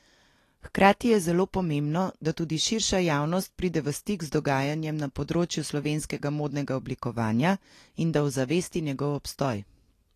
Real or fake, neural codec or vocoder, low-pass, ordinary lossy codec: real; none; 14.4 kHz; AAC, 48 kbps